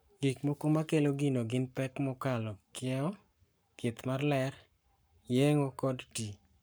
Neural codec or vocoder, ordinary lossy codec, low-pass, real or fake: codec, 44.1 kHz, 7.8 kbps, Pupu-Codec; none; none; fake